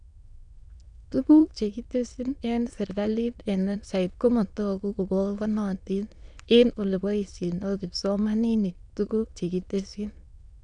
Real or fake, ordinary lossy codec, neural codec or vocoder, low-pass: fake; none; autoencoder, 22.05 kHz, a latent of 192 numbers a frame, VITS, trained on many speakers; 9.9 kHz